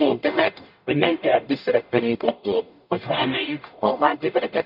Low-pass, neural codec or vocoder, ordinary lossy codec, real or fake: 5.4 kHz; codec, 44.1 kHz, 0.9 kbps, DAC; none; fake